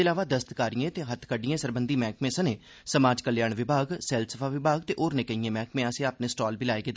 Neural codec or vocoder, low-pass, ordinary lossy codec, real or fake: none; none; none; real